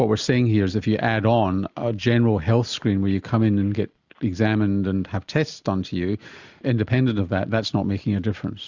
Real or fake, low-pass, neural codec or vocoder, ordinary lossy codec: real; 7.2 kHz; none; Opus, 64 kbps